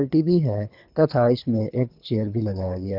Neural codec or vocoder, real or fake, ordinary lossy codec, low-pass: codec, 24 kHz, 3 kbps, HILCodec; fake; none; 5.4 kHz